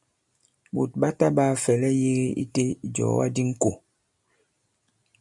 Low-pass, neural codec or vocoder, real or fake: 10.8 kHz; none; real